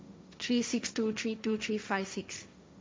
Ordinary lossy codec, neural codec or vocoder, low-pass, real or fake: none; codec, 16 kHz, 1.1 kbps, Voila-Tokenizer; none; fake